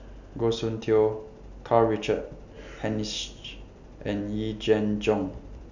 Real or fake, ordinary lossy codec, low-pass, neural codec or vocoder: real; none; 7.2 kHz; none